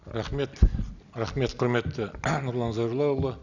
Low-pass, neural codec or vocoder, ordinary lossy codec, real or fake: 7.2 kHz; none; none; real